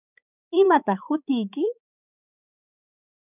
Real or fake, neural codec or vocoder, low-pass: fake; codec, 16 kHz, 4 kbps, X-Codec, HuBERT features, trained on balanced general audio; 3.6 kHz